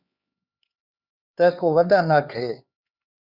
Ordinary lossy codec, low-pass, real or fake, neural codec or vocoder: Opus, 64 kbps; 5.4 kHz; fake; codec, 16 kHz, 4 kbps, X-Codec, HuBERT features, trained on LibriSpeech